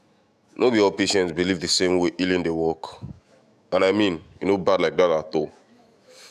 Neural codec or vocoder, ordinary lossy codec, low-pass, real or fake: autoencoder, 48 kHz, 128 numbers a frame, DAC-VAE, trained on Japanese speech; none; 14.4 kHz; fake